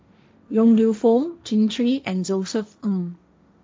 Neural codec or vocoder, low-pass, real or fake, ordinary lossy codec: codec, 16 kHz, 1.1 kbps, Voila-Tokenizer; none; fake; none